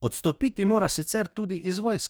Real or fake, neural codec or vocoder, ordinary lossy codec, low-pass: fake; codec, 44.1 kHz, 2.6 kbps, DAC; none; none